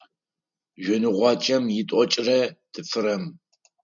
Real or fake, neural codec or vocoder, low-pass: real; none; 7.2 kHz